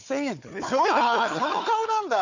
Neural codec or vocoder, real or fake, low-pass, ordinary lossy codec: codec, 16 kHz, 4 kbps, FunCodec, trained on Chinese and English, 50 frames a second; fake; 7.2 kHz; none